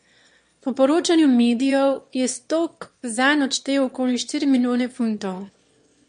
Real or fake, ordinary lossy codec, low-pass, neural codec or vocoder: fake; MP3, 48 kbps; 9.9 kHz; autoencoder, 22.05 kHz, a latent of 192 numbers a frame, VITS, trained on one speaker